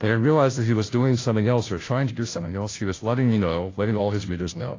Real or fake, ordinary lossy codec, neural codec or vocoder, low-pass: fake; AAC, 32 kbps; codec, 16 kHz, 0.5 kbps, FunCodec, trained on Chinese and English, 25 frames a second; 7.2 kHz